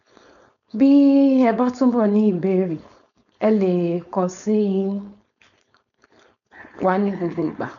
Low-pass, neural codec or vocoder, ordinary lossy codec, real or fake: 7.2 kHz; codec, 16 kHz, 4.8 kbps, FACodec; none; fake